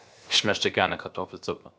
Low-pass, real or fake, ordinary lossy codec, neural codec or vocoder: none; fake; none; codec, 16 kHz, 0.7 kbps, FocalCodec